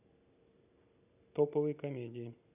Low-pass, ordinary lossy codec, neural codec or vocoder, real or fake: 3.6 kHz; none; none; real